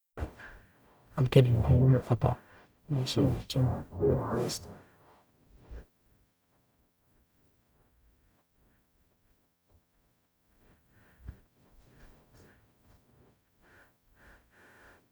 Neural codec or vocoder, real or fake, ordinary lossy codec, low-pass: codec, 44.1 kHz, 0.9 kbps, DAC; fake; none; none